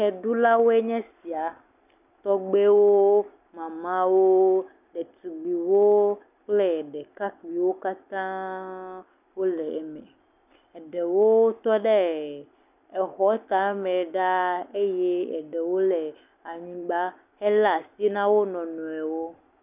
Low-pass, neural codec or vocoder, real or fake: 3.6 kHz; none; real